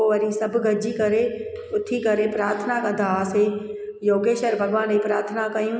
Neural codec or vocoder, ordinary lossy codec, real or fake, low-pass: none; none; real; none